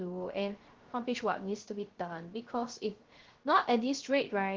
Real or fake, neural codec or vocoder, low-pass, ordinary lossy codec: fake; codec, 16 kHz, 0.3 kbps, FocalCodec; 7.2 kHz; Opus, 16 kbps